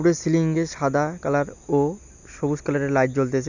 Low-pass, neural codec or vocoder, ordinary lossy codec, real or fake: 7.2 kHz; none; none; real